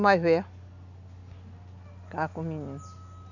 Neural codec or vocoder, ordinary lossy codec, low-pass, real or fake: none; none; 7.2 kHz; real